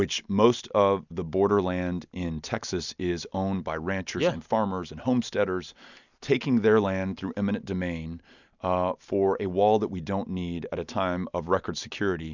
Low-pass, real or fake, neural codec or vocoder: 7.2 kHz; real; none